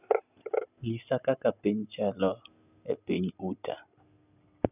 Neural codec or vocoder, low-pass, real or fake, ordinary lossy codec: none; 3.6 kHz; real; none